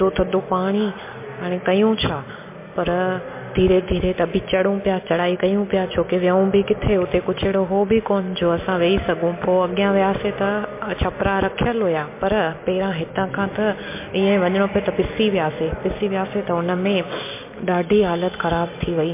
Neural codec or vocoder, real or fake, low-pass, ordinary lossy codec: none; real; 3.6 kHz; MP3, 24 kbps